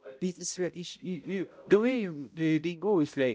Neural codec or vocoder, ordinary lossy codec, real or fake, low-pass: codec, 16 kHz, 0.5 kbps, X-Codec, HuBERT features, trained on balanced general audio; none; fake; none